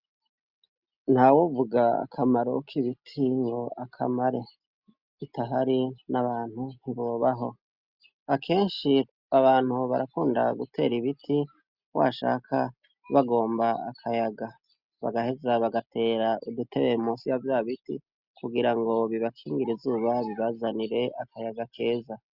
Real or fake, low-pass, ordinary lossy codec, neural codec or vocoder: real; 5.4 kHz; Opus, 64 kbps; none